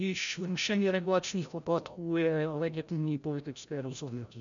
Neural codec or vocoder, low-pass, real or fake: codec, 16 kHz, 0.5 kbps, FreqCodec, larger model; 7.2 kHz; fake